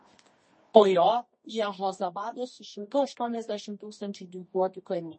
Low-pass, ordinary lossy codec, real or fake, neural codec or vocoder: 9.9 kHz; MP3, 32 kbps; fake; codec, 24 kHz, 0.9 kbps, WavTokenizer, medium music audio release